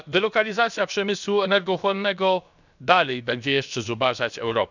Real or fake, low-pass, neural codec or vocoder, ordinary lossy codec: fake; 7.2 kHz; codec, 16 kHz, about 1 kbps, DyCAST, with the encoder's durations; none